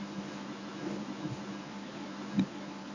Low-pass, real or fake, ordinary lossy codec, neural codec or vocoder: 7.2 kHz; fake; none; codec, 24 kHz, 0.9 kbps, WavTokenizer, medium speech release version 1